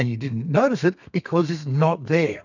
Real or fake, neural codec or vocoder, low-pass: fake; codec, 32 kHz, 1.9 kbps, SNAC; 7.2 kHz